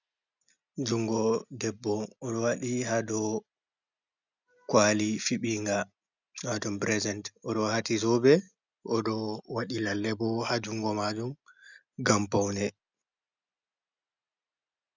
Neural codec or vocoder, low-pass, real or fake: none; 7.2 kHz; real